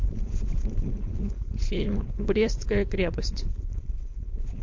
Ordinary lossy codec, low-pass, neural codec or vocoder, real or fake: MP3, 48 kbps; 7.2 kHz; codec, 16 kHz, 4.8 kbps, FACodec; fake